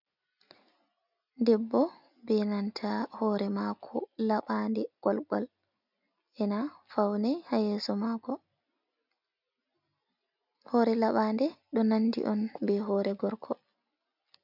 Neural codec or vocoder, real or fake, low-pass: none; real; 5.4 kHz